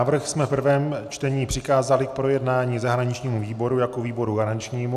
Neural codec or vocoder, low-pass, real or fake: none; 14.4 kHz; real